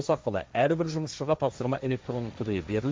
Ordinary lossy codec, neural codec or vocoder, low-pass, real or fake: none; codec, 16 kHz, 1.1 kbps, Voila-Tokenizer; none; fake